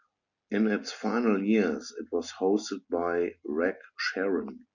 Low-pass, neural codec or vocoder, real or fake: 7.2 kHz; none; real